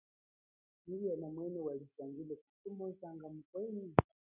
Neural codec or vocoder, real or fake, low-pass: none; real; 3.6 kHz